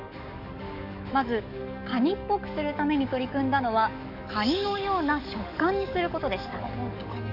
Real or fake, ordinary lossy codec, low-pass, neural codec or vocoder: fake; none; 5.4 kHz; codec, 44.1 kHz, 7.8 kbps, DAC